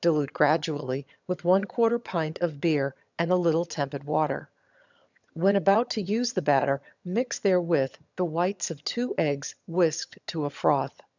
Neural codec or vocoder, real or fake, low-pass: vocoder, 22.05 kHz, 80 mel bands, HiFi-GAN; fake; 7.2 kHz